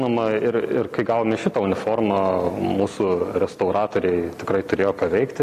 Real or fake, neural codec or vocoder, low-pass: real; none; 14.4 kHz